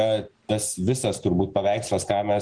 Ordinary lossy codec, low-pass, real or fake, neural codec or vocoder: Opus, 16 kbps; 9.9 kHz; real; none